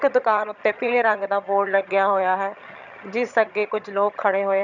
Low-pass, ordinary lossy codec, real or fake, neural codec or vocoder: 7.2 kHz; none; fake; vocoder, 22.05 kHz, 80 mel bands, HiFi-GAN